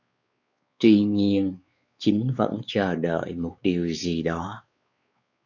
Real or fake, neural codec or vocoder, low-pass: fake; codec, 16 kHz, 4 kbps, X-Codec, WavLM features, trained on Multilingual LibriSpeech; 7.2 kHz